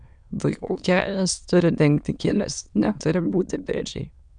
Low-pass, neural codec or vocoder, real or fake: 9.9 kHz; autoencoder, 22.05 kHz, a latent of 192 numbers a frame, VITS, trained on many speakers; fake